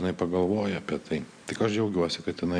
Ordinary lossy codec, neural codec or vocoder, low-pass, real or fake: MP3, 64 kbps; none; 9.9 kHz; real